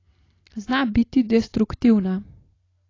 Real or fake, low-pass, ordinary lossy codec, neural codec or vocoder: real; 7.2 kHz; AAC, 32 kbps; none